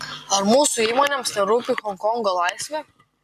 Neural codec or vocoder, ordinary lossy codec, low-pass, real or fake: none; MP3, 64 kbps; 14.4 kHz; real